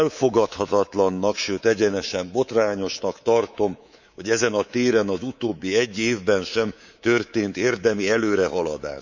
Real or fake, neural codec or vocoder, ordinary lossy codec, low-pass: fake; codec, 24 kHz, 3.1 kbps, DualCodec; none; 7.2 kHz